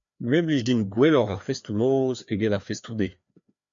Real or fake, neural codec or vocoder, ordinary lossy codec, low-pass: fake; codec, 16 kHz, 2 kbps, FreqCodec, larger model; MP3, 64 kbps; 7.2 kHz